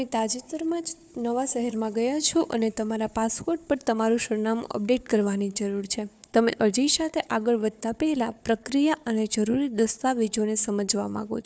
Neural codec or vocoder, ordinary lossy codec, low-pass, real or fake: codec, 16 kHz, 4 kbps, FunCodec, trained on Chinese and English, 50 frames a second; none; none; fake